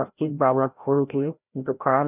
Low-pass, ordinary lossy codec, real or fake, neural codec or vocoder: 3.6 kHz; none; fake; codec, 16 kHz, 0.5 kbps, FreqCodec, larger model